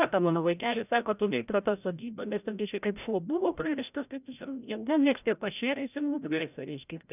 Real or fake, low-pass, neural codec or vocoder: fake; 3.6 kHz; codec, 16 kHz, 0.5 kbps, FreqCodec, larger model